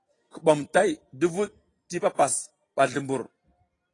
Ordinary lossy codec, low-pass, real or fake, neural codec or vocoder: AAC, 32 kbps; 10.8 kHz; fake; vocoder, 44.1 kHz, 128 mel bands every 256 samples, BigVGAN v2